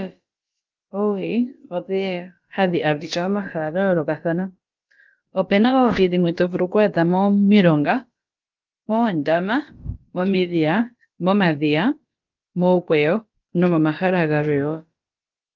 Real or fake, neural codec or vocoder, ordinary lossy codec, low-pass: fake; codec, 16 kHz, about 1 kbps, DyCAST, with the encoder's durations; Opus, 32 kbps; 7.2 kHz